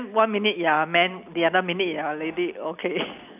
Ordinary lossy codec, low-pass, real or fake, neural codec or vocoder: none; 3.6 kHz; real; none